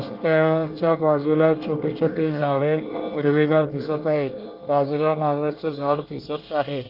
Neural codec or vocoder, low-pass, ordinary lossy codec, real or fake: codec, 24 kHz, 1 kbps, SNAC; 5.4 kHz; Opus, 24 kbps; fake